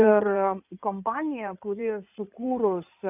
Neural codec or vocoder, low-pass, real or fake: codec, 16 kHz in and 24 kHz out, 2.2 kbps, FireRedTTS-2 codec; 3.6 kHz; fake